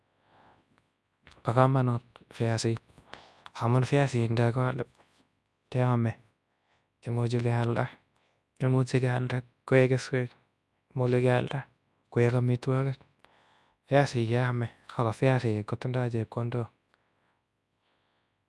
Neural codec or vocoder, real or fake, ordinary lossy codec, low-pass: codec, 24 kHz, 0.9 kbps, WavTokenizer, large speech release; fake; none; none